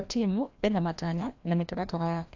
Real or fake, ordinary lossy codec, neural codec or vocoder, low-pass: fake; AAC, 48 kbps; codec, 16 kHz, 1 kbps, FreqCodec, larger model; 7.2 kHz